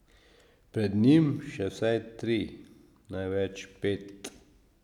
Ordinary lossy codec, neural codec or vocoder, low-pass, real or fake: none; none; 19.8 kHz; real